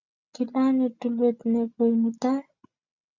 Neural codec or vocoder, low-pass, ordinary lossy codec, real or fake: none; 7.2 kHz; Opus, 64 kbps; real